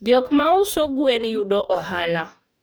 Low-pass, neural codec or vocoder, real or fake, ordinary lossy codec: none; codec, 44.1 kHz, 2.6 kbps, DAC; fake; none